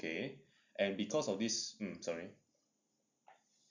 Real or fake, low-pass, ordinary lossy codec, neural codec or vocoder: real; 7.2 kHz; none; none